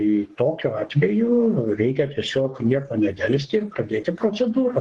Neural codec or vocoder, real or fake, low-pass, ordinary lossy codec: codec, 44.1 kHz, 3.4 kbps, Pupu-Codec; fake; 10.8 kHz; Opus, 16 kbps